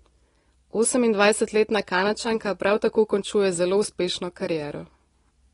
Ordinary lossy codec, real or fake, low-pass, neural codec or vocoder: AAC, 32 kbps; real; 10.8 kHz; none